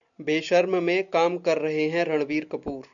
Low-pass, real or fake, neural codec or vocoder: 7.2 kHz; real; none